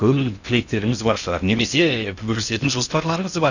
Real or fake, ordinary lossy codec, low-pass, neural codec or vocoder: fake; none; 7.2 kHz; codec, 16 kHz in and 24 kHz out, 0.6 kbps, FocalCodec, streaming, 2048 codes